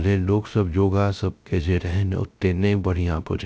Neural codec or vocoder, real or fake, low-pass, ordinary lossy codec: codec, 16 kHz, 0.3 kbps, FocalCodec; fake; none; none